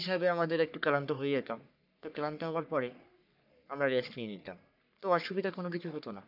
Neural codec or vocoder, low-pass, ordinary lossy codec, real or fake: codec, 44.1 kHz, 3.4 kbps, Pupu-Codec; 5.4 kHz; none; fake